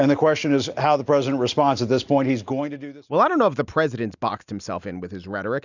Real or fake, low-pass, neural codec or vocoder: real; 7.2 kHz; none